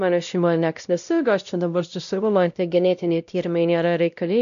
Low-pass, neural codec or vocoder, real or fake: 7.2 kHz; codec, 16 kHz, 0.5 kbps, X-Codec, WavLM features, trained on Multilingual LibriSpeech; fake